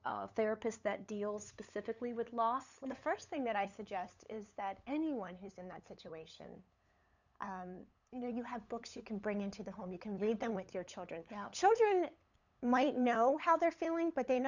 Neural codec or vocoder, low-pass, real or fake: codec, 16 kHz, 8 kbps, FunCodec, trained on LibriTTS, 25 frames a second; 7.2 kHz; fake